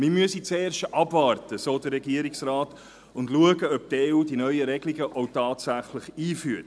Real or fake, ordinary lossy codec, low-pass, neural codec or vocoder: real; none; none; none